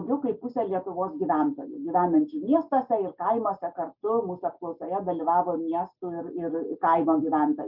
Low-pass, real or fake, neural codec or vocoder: 5.4 kHz; real; none